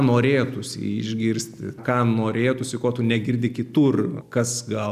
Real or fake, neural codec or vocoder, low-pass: real; none; 14.4 kHz